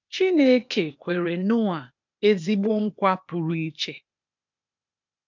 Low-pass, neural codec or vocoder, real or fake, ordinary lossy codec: 7.2 kHz; codec, 16 kHz, 0.8 kbps, ZipCodec; fake; MP3, 64 kbps